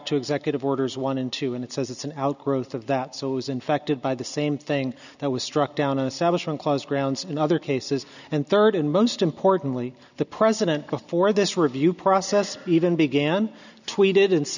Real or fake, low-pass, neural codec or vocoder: real; 7.2 kHz; none